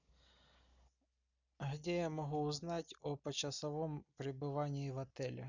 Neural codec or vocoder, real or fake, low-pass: none; real; 7.2 kHz